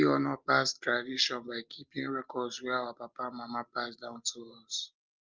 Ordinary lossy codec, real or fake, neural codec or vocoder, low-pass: Opus, 24 kbps; real; none; 7.2 kHz